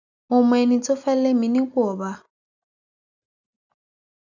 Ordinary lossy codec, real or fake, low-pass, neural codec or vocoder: none; real; 7.2 kHz; none